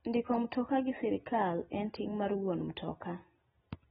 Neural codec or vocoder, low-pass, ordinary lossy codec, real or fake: none; 19.8 kHz; AAC, 16 kbps; real